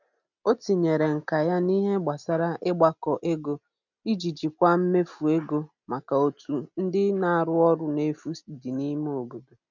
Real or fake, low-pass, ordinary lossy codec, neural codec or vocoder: real; 7.2 kHz; none; none